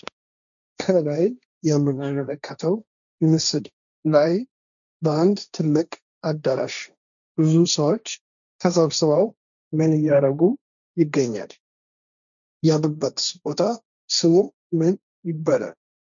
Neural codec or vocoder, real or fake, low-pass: codec, 16 kHz, 1.1 kbps, Voila-Tokenizer; fake; 7.2 kHz